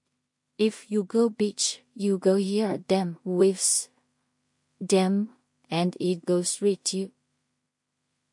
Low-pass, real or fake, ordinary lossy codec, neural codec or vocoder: 10.8 kHz; fake; MP3, 48 kbps; codec, 16 kHz in and 24 kHz out, 0.4 kbps, LongCat-Audio-Codec, two codebook decoder